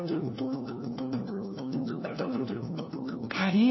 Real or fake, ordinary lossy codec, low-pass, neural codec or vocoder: fake; MP3, 24 kbps; 7.2 kHz; codec, 16 kHz, 1 kbps, FunCodec, trained on LibriTTS, 50 frames a second